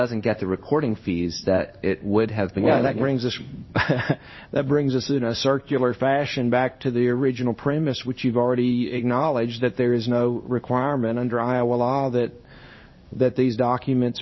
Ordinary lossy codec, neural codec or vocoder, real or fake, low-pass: MP3, 24 kbps; codec, 16 kHz in and 24 kHz out, 1 kbps, XY-Tokenizer; fake; 7.2 kHz